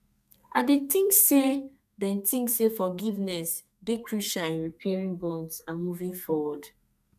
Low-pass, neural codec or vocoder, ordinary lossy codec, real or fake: 14.4 kHz; codec, 32 kHz, 1.9 kbps, SNAC; none; fake